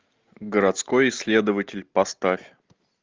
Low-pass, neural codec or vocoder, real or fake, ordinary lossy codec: 7.2 kHz; none; real; Opus, 32 kbps